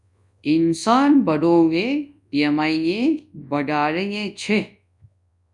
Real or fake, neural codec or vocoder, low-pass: fake; codec, 24 kHz, 0.9 kbps, WavTokenizer, large speech release; 10.8 kHz